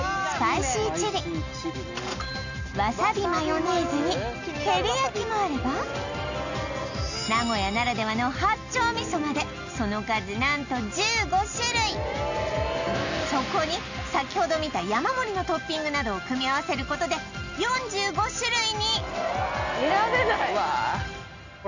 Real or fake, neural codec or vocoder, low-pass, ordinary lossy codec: real; none; 7.2 kHz; none